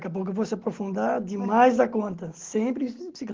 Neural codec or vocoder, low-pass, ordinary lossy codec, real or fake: none; 7.2 kHz; Opus, 16 kbps; real